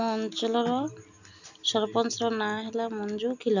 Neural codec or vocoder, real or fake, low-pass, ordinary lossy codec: none; real; 7.2 kHz; none